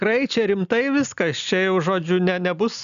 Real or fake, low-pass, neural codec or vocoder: real; 7.2 kHz; none